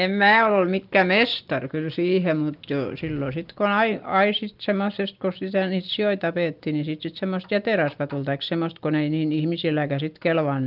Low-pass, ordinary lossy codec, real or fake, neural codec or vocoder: 5.4 kHz; Opus, 24 kbps; real; none